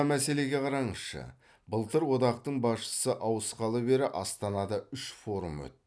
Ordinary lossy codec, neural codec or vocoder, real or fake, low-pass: none; none; real; none